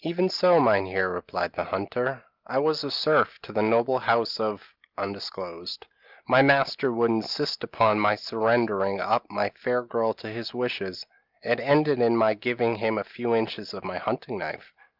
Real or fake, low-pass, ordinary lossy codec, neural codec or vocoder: real; 5.4 kHz; Opus, 24 kbps; none